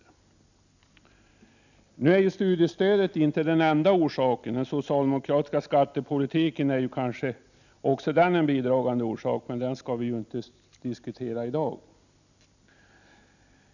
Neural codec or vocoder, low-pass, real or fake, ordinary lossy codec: none; 7.2 kHz; real; none